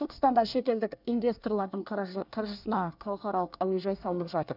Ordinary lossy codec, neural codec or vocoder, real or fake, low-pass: none; codec, 24 kHz, 1 kbps, SNAC; fake; 5.4 kHz